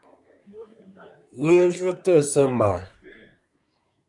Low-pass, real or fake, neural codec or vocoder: 10.8 kHz; fake; codec, 24 kHz, 1 kbps, SNAC